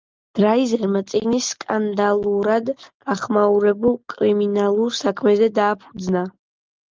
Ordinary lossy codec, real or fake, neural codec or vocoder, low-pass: Opus, 32 kbps; real; none; 7.2 kHz